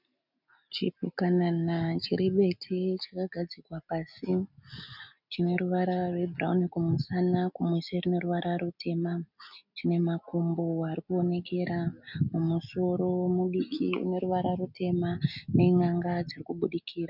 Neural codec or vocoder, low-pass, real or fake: none; 5.4 kHz; real